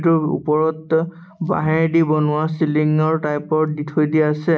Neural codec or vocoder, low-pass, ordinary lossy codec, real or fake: none; none; none; real